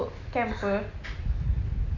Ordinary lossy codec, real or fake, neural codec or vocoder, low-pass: none; real; none; 7.2 kHz